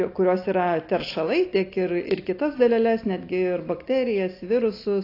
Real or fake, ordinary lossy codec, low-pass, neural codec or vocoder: real; AAC, 32 kbps; 5.4 kHz; none